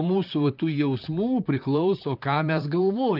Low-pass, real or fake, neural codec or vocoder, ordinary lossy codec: 5.4 kHz; fake; vocoder, 44.1 kHz, 128 mel bands, Pupu-Vocoder; Opus, 32 kbps